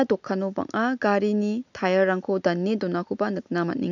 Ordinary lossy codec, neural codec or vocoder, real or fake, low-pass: none; none; real; 7.2 kHz